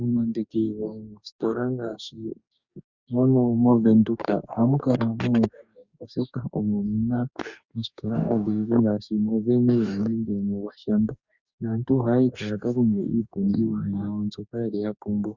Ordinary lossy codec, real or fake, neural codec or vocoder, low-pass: Opus, 64 kbps; fake; codec, 44.1 kHz, 2.6 kbps, DAC; 7.2 kHz